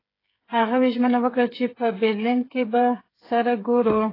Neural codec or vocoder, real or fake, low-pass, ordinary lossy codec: codec, 16 kHz, 8 kbps, FreqCodec, smaller model; fake; 5.4 kHz; AAC, 24 kbps